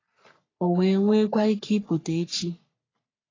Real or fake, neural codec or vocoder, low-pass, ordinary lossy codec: fake; codec, 44.1 kHz, 3.4 kbps, Pupu-Codec; 7.2 kHz; AAC, 32 kbps